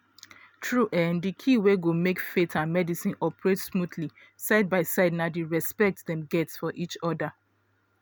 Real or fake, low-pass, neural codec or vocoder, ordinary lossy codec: real; none; none; none